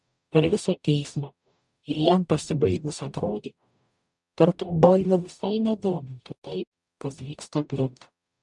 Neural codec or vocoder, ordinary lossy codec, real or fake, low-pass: codec, 44.1 kHz, 0.9 kbps, DAC; MP3, 96 kbps; fake; 10.8 kHz